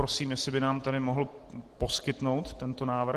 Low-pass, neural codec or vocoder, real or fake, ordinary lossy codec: 14.4 kHz; none; real; Opus, 16 kbps